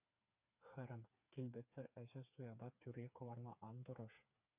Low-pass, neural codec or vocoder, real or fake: 3.6 kHz; codec, 16 kHz, 4 kbps, FreqCodec, smaller model; fake